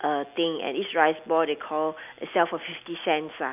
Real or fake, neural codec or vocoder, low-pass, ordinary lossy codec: real; none; 3.6 kHz; none